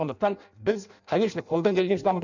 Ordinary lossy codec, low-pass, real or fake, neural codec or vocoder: none; 7.2 kHz; fake; codec, 16 kHz in and 24 kHz out, 0.6 kbps, FireRedTTS-2 codec